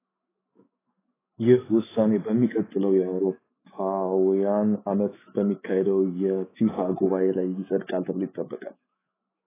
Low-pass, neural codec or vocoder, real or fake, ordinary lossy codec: 3.6 kHz; autoencoder, 48 kHz, 128 numbers a frame, DAC-VAE, trained on Japanese speech; fake; AAC, 16 kbps